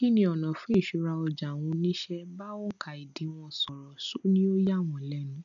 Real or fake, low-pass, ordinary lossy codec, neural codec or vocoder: real; 7.2 kHz; none; none